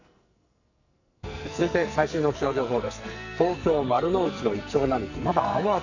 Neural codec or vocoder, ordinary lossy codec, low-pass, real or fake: codec, 44.1 kHz, 2.6 kbps, SNAC; none; 7.2 kHz; fake